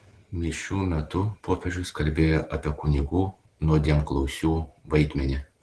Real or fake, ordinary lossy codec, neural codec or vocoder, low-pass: real; Opus, 16 kbps; none; 10.8 kHz